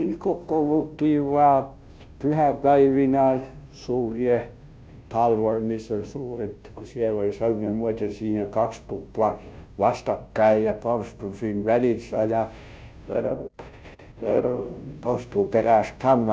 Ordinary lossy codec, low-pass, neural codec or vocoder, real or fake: none; none; codec, 16 kHz, 0.5 kbps, FunCodec, trained on Chinese and English, 25 frames a second; fake